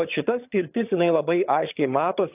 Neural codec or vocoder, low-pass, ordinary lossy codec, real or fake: vocoder, 22.05 kHz, 80 mel bands, HiFi-GAN; 3.6 kHz; AAC, 32 kbps; fake